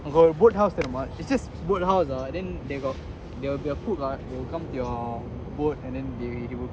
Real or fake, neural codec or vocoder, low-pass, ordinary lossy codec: real; none; none; none